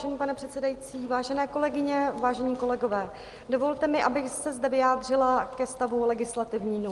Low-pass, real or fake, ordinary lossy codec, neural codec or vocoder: 10.8 kHz; real; Opus, 24 kbps; none